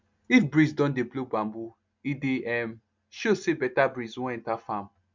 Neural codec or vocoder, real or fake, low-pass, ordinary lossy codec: none; real; 7.2 kHz; MP3, 64 kbps